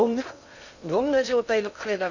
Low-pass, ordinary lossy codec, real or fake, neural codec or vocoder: 7.2 kHz; none; fake; codec, 16 kHz in and 24 kHz out, 0.8 kbps, FocalCodec, streaming, 65536 codes